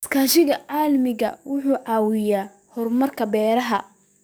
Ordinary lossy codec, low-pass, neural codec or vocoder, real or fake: none; none; codec, 44.1 kHz, 7.8 kbps, DAC; fake